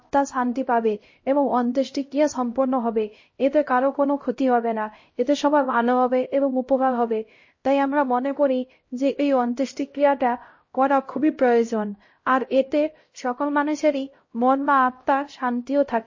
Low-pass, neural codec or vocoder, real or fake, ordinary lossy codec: 7.2 kHz; codec, 16 kHz, 0.5 kbps, X-Codec, HuBERT features, trained on LibriSpeech; fake; MP3, 32 kbps